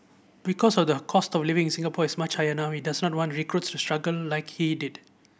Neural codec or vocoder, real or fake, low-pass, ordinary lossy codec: none; real; none; none